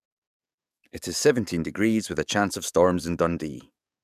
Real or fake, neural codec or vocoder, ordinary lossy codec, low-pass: fake; codec, 44.1 kHz, 7.8 kbps, DAC; none; 14.4 kHz